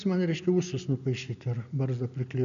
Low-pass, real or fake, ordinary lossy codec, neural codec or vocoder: 7.2 kHz; real; MP3, 96 kbps; none